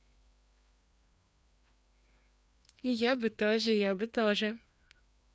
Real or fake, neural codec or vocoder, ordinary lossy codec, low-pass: fake; codec, 16 kHz, 2 kbps, FreqCodec, larger model; none; none